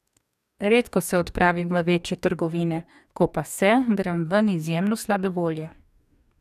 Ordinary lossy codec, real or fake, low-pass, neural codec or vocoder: none; fake; 14.4 kHz; codec, 44.1 kHz, 2.6 kbps, DAC